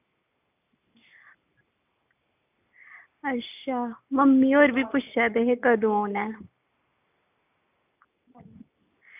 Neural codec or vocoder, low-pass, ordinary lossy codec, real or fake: none; 3.6 kHz; none; real